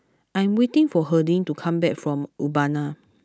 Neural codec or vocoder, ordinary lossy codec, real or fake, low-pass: none; none; real; none